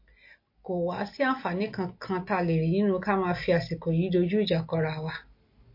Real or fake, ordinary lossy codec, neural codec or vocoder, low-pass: real; MP3, 32 kbps; none; 5.4 kHz